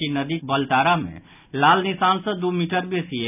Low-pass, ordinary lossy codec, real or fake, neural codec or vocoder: 3.6 kHz; none; real; none